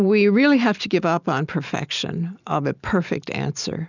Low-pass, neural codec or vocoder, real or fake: 7.2 kHz; none; real